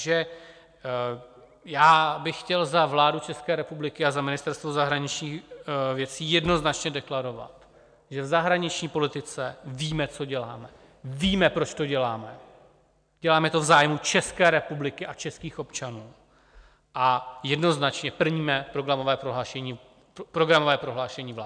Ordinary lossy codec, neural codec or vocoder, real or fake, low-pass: MP3, 96 kbps; none; real; 9.9 kHz